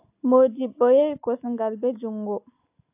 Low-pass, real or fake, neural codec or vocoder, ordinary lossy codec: 3.6 kHz; real; none; AAC, 32 kbps